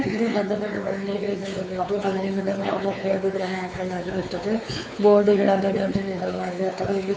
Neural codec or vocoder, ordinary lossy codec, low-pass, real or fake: codec, 16 kHz, 4 kbps, X-Codec, WavLM features, trained on Multilingual LibriSpeech; none; none; fake